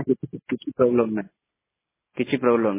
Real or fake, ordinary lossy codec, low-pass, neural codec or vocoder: real; MP3, 16 kbps; 3.6 kHz; none